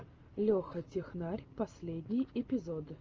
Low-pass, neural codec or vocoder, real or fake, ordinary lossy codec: 7.2 kHz; none; real; Opus, 24 kbps